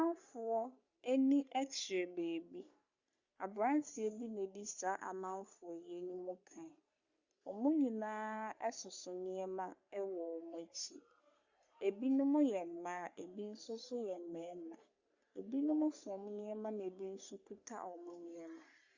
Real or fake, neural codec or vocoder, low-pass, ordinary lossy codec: fake; codec, 44.1 kHz, 3.4 kbps, Pupu-Codec; 7.2 kHz; Opus, 64 kbps